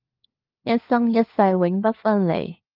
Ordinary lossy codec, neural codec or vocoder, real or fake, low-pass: Opus, 24 kbps; codec, 16 kHz, 4 kbps, FunCodec, trained on LibriTTS, 50 frames a second; fake; 5.4 kHz